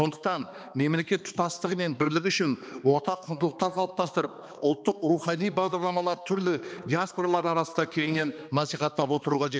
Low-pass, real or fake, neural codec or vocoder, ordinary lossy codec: none; fake; codec, 16 kHz, 2 kbps, X-Codec, HuBERT features, trained on balanced general audio; none